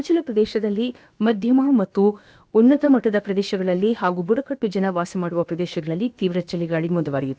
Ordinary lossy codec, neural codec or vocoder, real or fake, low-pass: none; codec, 16 kHz, about 1 kbps, DyCAST, with the encoder's durations; fake; none